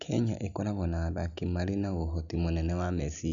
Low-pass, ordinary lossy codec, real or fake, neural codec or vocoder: 7.2 kHz; none; real; none